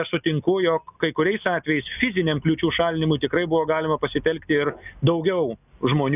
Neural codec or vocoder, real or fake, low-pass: none; real; 3.6 kHz